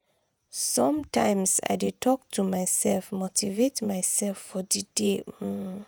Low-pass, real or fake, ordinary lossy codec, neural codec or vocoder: none; fake; none; vocoder, 48 kHz, 128 mel bands, Vocos